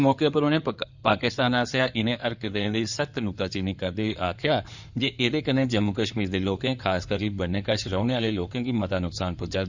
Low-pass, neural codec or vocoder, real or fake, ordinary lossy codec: 7.2 kHz; codec, 16 kHz in and 24 kHz out, 2.2 kbps, FireRedTTS-2 codec; fake; Opus, 64 kbps